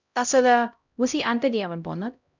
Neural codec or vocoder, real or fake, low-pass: codec, 16 kHz, 0.5 kbps, X-Codec, HuBERT features, trained on LibriSpeech; fake; 7.2 kHz